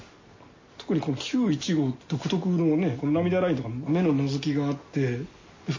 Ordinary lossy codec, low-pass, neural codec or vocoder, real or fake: MP3, 32 kbps; 7.2 kHz; none; real